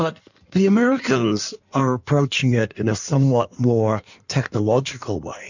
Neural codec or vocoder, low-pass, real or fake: codec, 16 kHz in and 24 kHz out, 1.1 kbps, FireRedTTS-2 codec; 7.2 kHz; fake